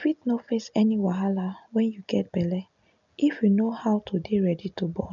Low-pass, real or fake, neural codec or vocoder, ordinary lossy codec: 7.2 kHz; real; none; none